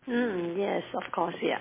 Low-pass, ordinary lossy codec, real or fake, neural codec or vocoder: 3.6 kHz; MP3, 16 kbps; real; none